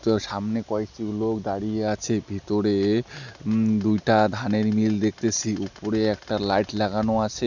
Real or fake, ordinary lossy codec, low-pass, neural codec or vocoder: real; none; 7.2 kHz; none